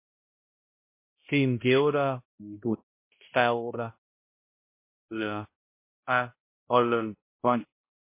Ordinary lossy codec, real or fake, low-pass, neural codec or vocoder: MP3, 24 kbps; fake; 3.6 kHz; codec, 16 kHz, 0.5 kbps, X-Codec, HuBERT features, trained on balanced general audio